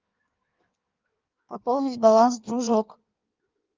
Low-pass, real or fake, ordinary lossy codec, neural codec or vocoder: 7.2 kHz; fake; Opus, 32 kbps; codec, 16 kHz in and 24 kHz out, 1.1 kbps, FireRedTTS-2 codec